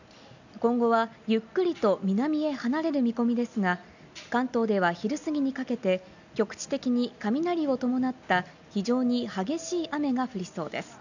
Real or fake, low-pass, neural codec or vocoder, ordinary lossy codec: real; 7.2 kHz; none; none